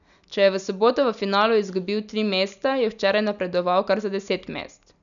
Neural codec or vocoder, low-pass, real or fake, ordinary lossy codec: none; 7.2 kHz; real; none